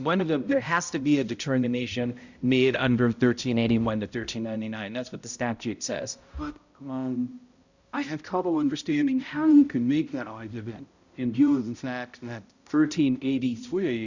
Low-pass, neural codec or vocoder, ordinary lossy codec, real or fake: 7.2 kHz; codec, 16 kHz, 0.5 kbps, X-Codec, HuBERT features, trained on balanced general audio; Opus, 64 kbps; fake